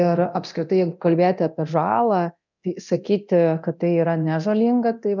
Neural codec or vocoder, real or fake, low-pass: codec, 24 kHz, 0.9 kbps, DualCodec; fake; 7.2 kHz